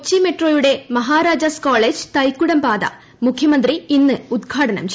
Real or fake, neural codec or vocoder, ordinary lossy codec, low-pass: real; none; none; none